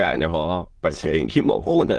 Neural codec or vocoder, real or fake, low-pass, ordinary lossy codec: autoencoder, 22.05 kHz, a latent of 192 numbers a frame, VITS, trained on many speakers; fake; 9.9 kHz; Opus, 16 kbps